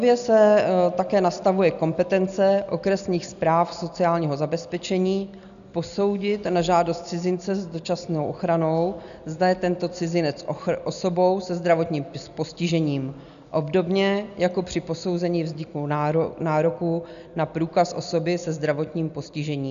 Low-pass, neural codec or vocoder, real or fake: 7.2 kHz; none; real